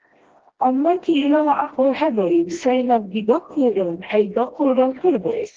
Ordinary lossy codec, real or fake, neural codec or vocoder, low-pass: Opus, 16 kbps; fake; codec, 16 kHz, 1 kbps, FreqCodec, smaller model; 7.2 kHz